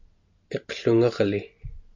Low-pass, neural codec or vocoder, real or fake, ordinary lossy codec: 7.2 kHz; none; real; MP3, 48 kbps